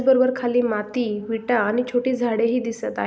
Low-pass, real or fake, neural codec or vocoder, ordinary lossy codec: none; real; none; none